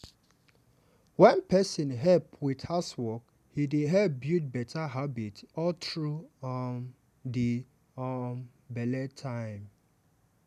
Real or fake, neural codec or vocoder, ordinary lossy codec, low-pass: real; none; none; 14.4 kHz